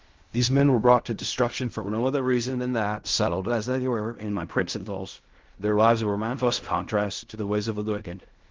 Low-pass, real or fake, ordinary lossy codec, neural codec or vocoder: 7.2 kHz; fake; Opus, 32 kbps; codec, 16 kHz in and 24 kHz out, 0.4 kbps, LongCat-Audio-Codec, fine tuned four codebook decoder